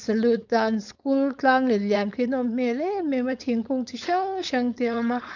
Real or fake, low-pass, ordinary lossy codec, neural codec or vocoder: fake; 7.2 kHz; none; codec, 16 kHz, 4.8 kbps, FACodec